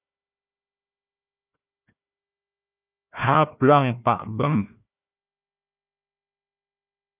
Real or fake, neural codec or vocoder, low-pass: fake; codec, 16 kHz, 1 kbps, FunCodec, trained on Chinese and English, 50 frames a second; 3.6 kHz